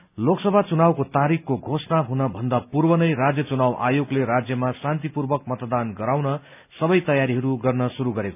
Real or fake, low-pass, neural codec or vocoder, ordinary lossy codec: real; 3.6 kHz; none; none